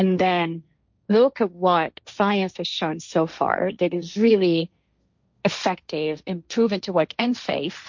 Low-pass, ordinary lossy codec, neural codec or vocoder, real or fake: 7.2 kHz; MP3, 48 kbps; codec, 16 kHz, 1.1 kbps, Voila-Tokenizer; fake